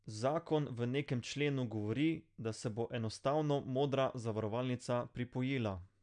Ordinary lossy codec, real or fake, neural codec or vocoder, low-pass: none; real; none; 9.9 kHz